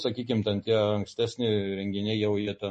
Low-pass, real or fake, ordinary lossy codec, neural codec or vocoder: 10.8 kHz; real; MP3, 32 kbps; none